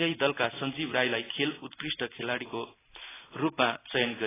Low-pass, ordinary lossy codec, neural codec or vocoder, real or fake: 3.6 kHz; AAC, 16 kbps; none; real